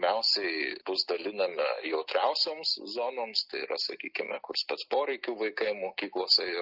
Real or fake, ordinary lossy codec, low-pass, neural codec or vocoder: real; Opus, 24 kbps; 5.4 kHz; none